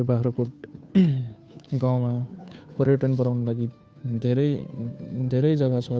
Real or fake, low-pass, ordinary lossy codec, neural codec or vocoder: fake; none; none; codec, 16 kHz, 2 kbps, FunCodec, trained on Chinese and English, 25 frames a second